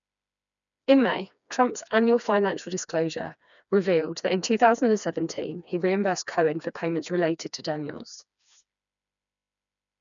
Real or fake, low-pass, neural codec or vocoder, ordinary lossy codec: fake; 7.2 kHz; codec, 16 kHz, 2 kbps, FreqCodec, smaller model; none